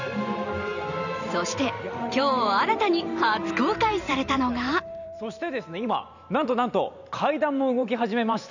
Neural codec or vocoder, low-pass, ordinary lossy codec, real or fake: vocoder, 44.1 kHz, 128 mel bands every 512 samples, BigVGAN v2; 7.2 kHz; none; fake